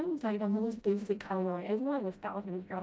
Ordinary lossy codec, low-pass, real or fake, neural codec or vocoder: none; none; fake; codec, 16 kHz, 0.5 kbps, FreqCodec, smaller model